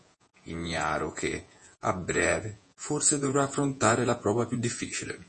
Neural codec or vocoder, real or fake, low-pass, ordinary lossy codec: vocoder, 48 kHz, 128 mel bands, Vocos; fake; 10.8 kHz; MP3, 32 kbps